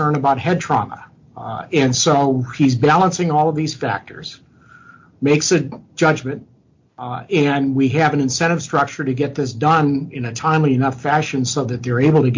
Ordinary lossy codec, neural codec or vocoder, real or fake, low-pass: MP3, 48 kbps; none; real; 7.2 kHz